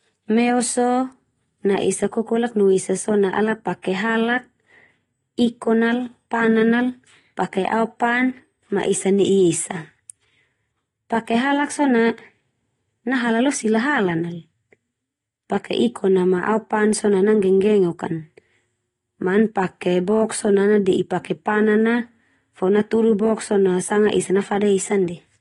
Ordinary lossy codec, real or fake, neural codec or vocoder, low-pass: AAC, 32 kbps; real; none; 10.8 kHz